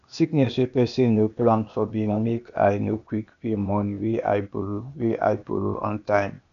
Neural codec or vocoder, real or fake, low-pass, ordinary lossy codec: codec, 16 kHz, 0.8 kbps, ZipCodec; fake; 7.2 kHz; none